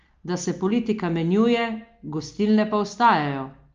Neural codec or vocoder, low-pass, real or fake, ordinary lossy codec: none; 7.2 kHz; real; Opus, 24 kbps